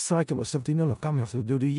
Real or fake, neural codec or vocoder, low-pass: fake; codec, 16 kHz in and 24 kHz out, 0.4 kbps, LongCat-Audio-Codec, four codebook decoder; 10.8 kHz